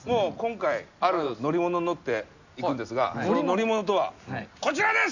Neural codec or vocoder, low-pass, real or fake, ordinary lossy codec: none; 7.2 kHz; real; none